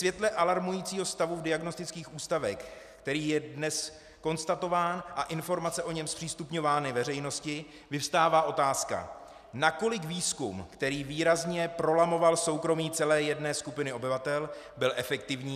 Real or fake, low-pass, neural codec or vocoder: real; 14.4 kHz; none